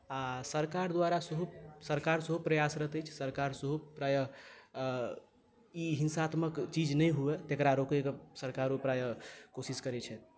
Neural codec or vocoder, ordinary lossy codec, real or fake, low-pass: none; none; real; none